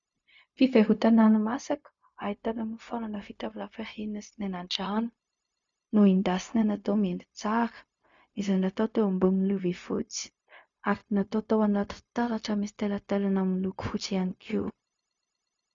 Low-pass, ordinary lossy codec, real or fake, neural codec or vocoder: 7.2 kHz; MP3, 64 kbps; fake; codec, 16 kHz, 0.4 kbps, LongCat-Audio-Codec